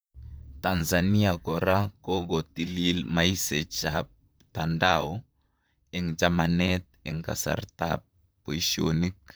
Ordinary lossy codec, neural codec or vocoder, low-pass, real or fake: none; vocoder, 44.1 kHz, 128 mel bands, Pupu-Vocoder; none; fake